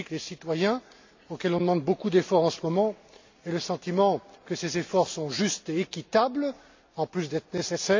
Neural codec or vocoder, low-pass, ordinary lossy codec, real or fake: none; 7.2 kHz; MP3, 64 kbps; real